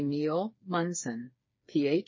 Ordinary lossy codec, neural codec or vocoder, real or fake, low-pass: MP3, 32 kbps; codec, 16 kHz, 4 kbps, FreqCodec, smaller model; fake; 7.2 kHz